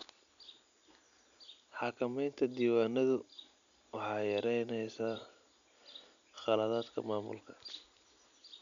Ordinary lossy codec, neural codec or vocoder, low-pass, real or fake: none; none; 7.2 kHz; real